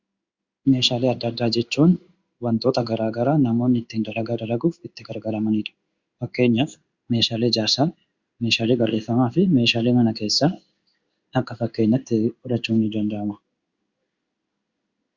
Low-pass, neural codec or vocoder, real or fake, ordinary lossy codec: 7.2 kHz; codec, 16 kHz in and 24 kHz out, 1 kbps, XY-Tokenizer; fake; Opus, 64 kbps